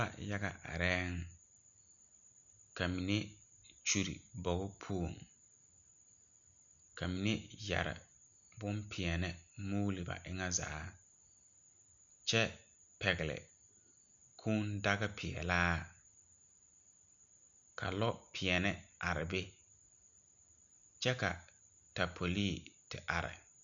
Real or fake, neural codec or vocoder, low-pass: real; none; 7.2 kHz